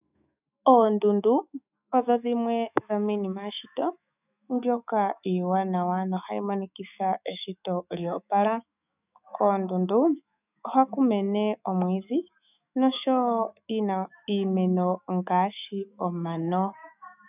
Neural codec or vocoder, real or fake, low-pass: autoencoder, 48 kHz, 128 numbers a frame, DAC-VAE, trained on Japanese speech; fake; 3.6 kHz